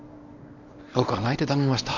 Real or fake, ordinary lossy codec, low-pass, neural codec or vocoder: fake; none; 7.2 kHz; codec, 24 kHz, 0.9 kbps, WavTokenizer, medium speech release version 1